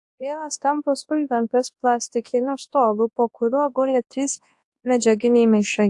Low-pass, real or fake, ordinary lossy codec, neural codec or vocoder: 10.8 kHz; fake; AAC, 64 kbps; codec, 24 kHz, 0.9 kbps, WavTokenizer, large speech release